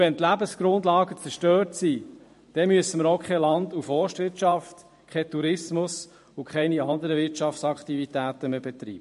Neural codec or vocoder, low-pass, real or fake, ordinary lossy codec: vocoder, 44.1 kHz, 128 mel bands every 512 samples, BigVGAN v2; 14.4 kHz; fake; MP3, 48 kbps